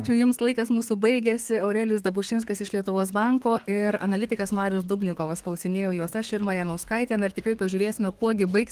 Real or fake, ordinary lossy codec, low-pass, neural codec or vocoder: fake; Opus, 24 kbps; 14.4 kHz; codec, 32 kHz, 1.9 kbps, SNAC